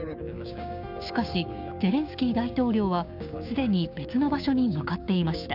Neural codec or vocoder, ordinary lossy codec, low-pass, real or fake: codec, 16 kHz, 6 kbps, DAC; none; 5.4 kHz; fake